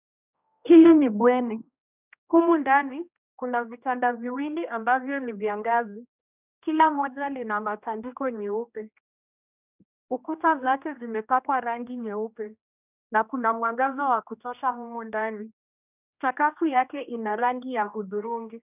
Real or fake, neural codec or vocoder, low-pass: fake; codec, 16 kHz, 1 kbps, X-Codec, HuBERT features, trained on general audio; 3.6 kHz